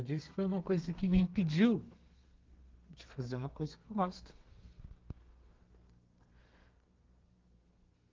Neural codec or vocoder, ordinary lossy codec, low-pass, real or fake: codec, 32 kHz, 1.9 kbps, SNAC; Opus, 32 kbps; 7.2 kHz; fake